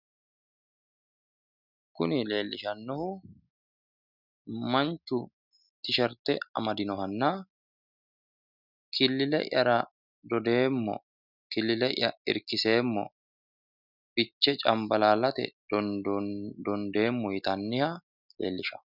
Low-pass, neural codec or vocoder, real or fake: 5.4 kHz; none; real